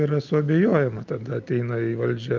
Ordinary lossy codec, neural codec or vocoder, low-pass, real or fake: Opus, 24 kbps; none; 7.2 kHz; real